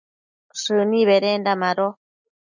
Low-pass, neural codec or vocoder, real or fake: 7.2 kHz; none; real